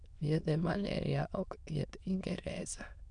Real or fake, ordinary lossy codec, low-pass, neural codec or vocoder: fake; none; 9.9 kHz; autoencoder, 22.05 kHz, a latent of 192 numbers a frame, VITS, trained on many speakers